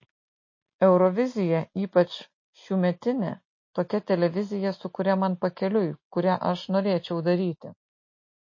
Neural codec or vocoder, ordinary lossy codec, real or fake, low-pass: none; MP3, 32 kbps; real; 7.2 kHz